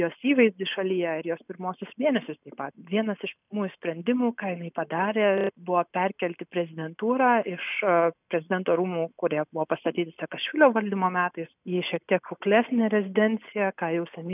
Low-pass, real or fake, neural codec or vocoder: 3.6 kHz; real; none